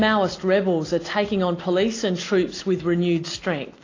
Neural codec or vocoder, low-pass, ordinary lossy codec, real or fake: none; 7.2 kHz; AAC, 32 kbps; real